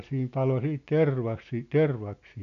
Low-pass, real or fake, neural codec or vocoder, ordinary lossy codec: 7.2 kHz; real; none; none